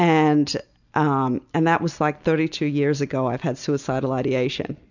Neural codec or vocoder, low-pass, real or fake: none; 7.2 kHz; real